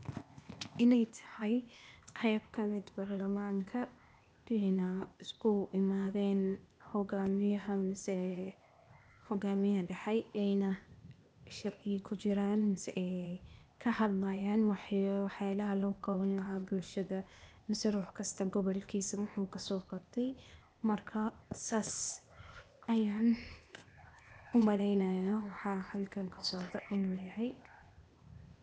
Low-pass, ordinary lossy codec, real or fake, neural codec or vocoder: none; none; fake; codec, 16 kHz, 0.8 kbps, ZipCodec